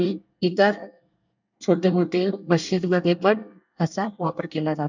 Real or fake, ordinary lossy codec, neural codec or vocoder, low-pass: fake; none; codec, 24 kHz, 1 kbps, SNAC; 7.2 kHz